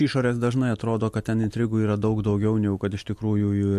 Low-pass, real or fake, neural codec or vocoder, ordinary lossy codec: 14.4 kHz; real; none; MP3, 64 kbps